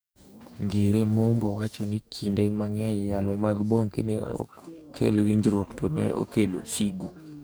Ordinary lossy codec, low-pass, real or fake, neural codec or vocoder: none; none; fake; codec, 44.1 kHz, 2.6 kbps, DAC